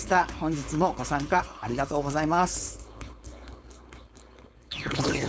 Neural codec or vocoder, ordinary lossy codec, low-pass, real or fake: codec, 16 kHz, 4.8 kbps, FACodec; none; none; fake